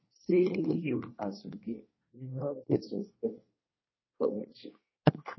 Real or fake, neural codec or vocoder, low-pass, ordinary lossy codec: fake; codec, 24 kHz, 1 kbps, SNAC; 7.2 kHz; MP3, 24 kbps